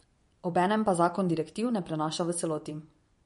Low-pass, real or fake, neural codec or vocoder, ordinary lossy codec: 10.8 kHz; fake; vocoder, 24 kHz, 100 mel bands, Vocos; MP3, 48 kbps